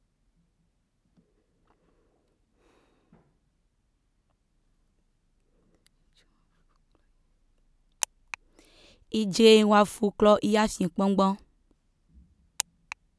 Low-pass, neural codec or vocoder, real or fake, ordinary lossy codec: none; none; real; none